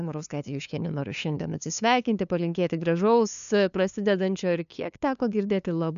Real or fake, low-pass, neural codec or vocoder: fake; 7.2 kHz; codec, 16 kHz, 2 kbps, FunCodec, trained on LibriTTS, 25 frames a second